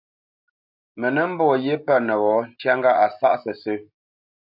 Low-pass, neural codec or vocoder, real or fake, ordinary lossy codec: 5.4 kHz; none; real; AAC, 48 kbps